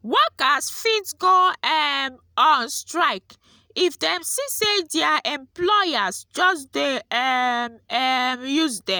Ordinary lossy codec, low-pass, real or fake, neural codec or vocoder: none; none; real; none